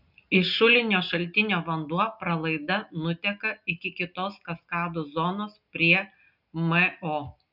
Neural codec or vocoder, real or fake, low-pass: none; real; 5.4 kHz